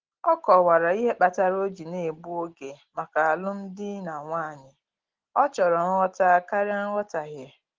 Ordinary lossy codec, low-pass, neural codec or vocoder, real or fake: Opus, 16 kbps; 7.2 kHz; none; real